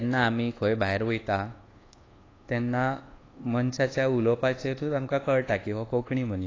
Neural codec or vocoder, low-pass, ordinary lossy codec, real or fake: codec, 24 kHz, 1.2 kbps, DualCodec; 7.2 kHz; AAC, 32 kbps; fake